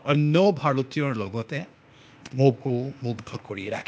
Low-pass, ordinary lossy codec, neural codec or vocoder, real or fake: none; none; codec, 16 kHz, 0.8 kbps, ZipCodec; fake